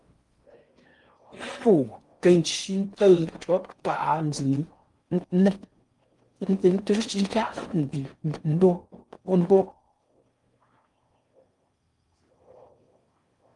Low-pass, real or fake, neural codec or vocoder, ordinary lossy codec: 10.8 kHz; fake; codec, 16 kHz in and 24 kHz out, 0.6 kbps, FocalCodec, streaming, 4096 codes; Opus, 32 kbps